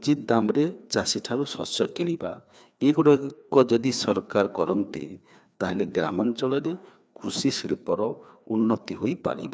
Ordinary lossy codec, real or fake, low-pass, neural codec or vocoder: none; fake; none; codec, 16 kHz, 2 kbps, FreqCodec, larger model